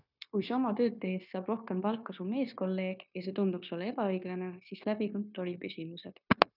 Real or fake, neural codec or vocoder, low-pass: fake; codec, 16 kHz, 0.9 kbps, LongCat-Audio-Codec; 5.4 kHz